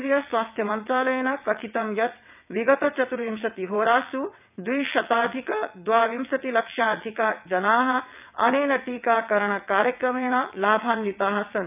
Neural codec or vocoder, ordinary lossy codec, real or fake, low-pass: vocoder, 22.05 kHz, 80 mel bands, WaveNeXt; none; fake; 3.6 kHz